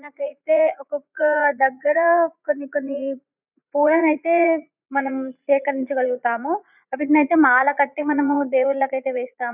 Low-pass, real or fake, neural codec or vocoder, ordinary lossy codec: 3.6 kHz; fake; vocoder, 44.1 kHz, 80 mel bands, Vocos; none